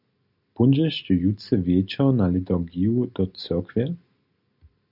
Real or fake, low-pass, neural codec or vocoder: real; 5.4 kHz; none